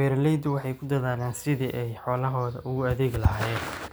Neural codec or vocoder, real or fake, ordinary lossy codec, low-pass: none; real; none; none